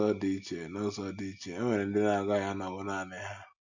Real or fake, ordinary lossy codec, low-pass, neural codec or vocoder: real; none; 7.2 kHz; none